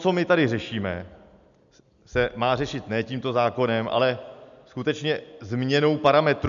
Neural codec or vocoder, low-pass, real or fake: none; 7.2 kHz; real